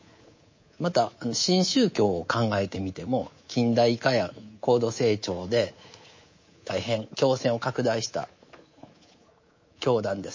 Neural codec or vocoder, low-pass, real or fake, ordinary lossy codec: codec, 24 kHz, 3.1 kbps, DualCodec; 7.2 kHz; fake; MP3, 32 kbps